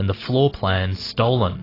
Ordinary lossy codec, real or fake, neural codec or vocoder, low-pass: AAC, 24 kbps; real; none; 5.4 kHz